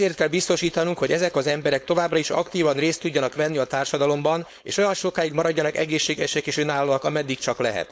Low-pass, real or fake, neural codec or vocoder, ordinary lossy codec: none; fake; codec, 16 kHz, 4.8 kbps, FACodec; none